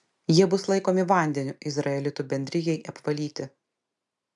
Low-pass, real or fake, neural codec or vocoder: 10.8 kHz; real; none